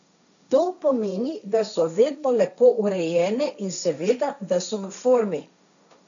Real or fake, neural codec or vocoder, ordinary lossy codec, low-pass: fake; codec, 16 kHz, 1.1 kbps, Voila-Tokenizer; none; 7.2 kHz